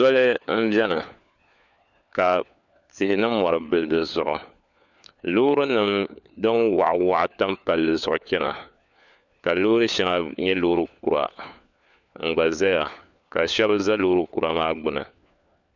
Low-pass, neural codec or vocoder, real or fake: 7.2 kHz; codec, 16 kHz, 4 kbps, FunCodec, trained on LibriTTS, 50 frames a second; fake